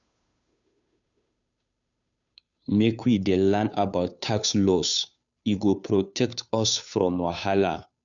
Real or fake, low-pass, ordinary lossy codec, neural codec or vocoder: fake; 7.2 kHz; none; codec, 16 kHz, 2 kbps, FunCodec, trained on Chinese and English, 25 frames a second